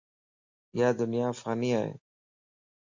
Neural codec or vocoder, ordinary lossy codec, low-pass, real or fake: none; MP3, 48 kbps; 7.2 kHz; real